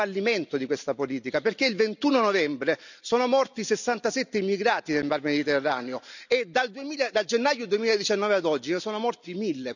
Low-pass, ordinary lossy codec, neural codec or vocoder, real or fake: 7.2 kHz; none; none; real